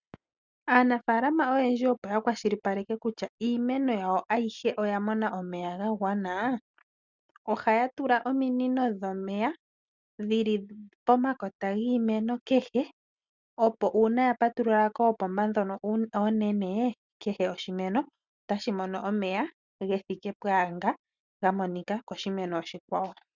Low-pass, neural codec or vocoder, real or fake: 7.2 kHz; none; real